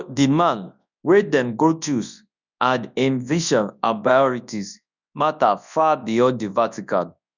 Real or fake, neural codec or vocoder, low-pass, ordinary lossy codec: fake; codec, 24 kHz, 0.9 kbps, WavTokenizer, large speech release; 7.2 kHz; none